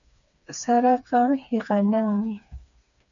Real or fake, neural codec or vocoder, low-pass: fake; codec, 16 kHz, 4 kbps, FreqCodec, smaller model; 7.2 kHz